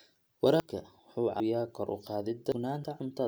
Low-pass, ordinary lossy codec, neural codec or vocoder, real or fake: none; none; none; real